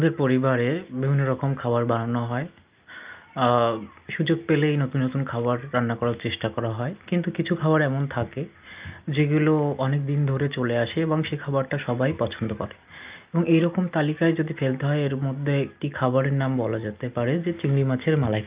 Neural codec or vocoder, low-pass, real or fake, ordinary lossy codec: none; 3.6 kHz; real; Opus, 24 kbps